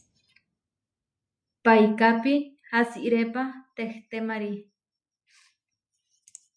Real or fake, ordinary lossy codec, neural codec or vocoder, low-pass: real; MP3, 96 kbps; none; 9.9 kHz